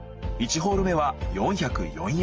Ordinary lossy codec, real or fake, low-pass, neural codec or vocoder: Opus, 24 kbps; real; 7.2 kHz; none